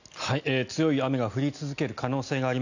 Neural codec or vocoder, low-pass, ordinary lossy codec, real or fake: none; 7.2 kHz; none; real